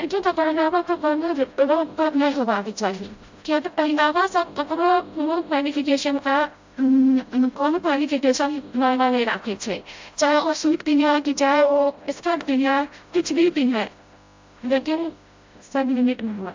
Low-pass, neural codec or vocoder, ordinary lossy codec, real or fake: 7.2 kHz; codec, 16 kHz, 0.5 kbps, FreqCodec, smaller model; MP3, 48 kbps; fake